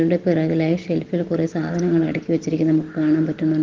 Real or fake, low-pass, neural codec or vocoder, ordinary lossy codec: real; 7.2 kHz; none; Opus, 16 kbps